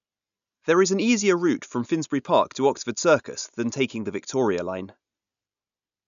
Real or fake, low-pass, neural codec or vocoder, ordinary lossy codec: real; 7.2 kHz; none; none